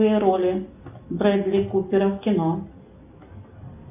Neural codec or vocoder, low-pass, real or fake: none; 3.6 kHz; real